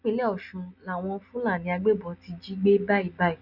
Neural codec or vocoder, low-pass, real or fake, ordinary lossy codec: none; 5.4 kHz; real; Opus, 64 kbps